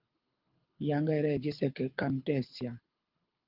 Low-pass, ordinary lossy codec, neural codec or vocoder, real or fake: 5.4 kHz; Opus, 24 kbps; codec, 24 kHz, 6 kbps, HILCodec; fake